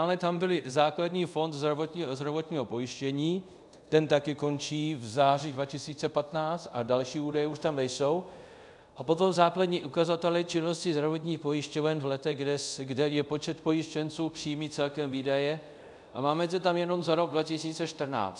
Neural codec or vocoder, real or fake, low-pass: codec, 24 kHz, 0.5 kbps, DualCodec; fake; 10.8 kHz